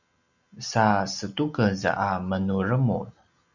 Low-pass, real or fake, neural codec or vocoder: 7.2 kHz; real; none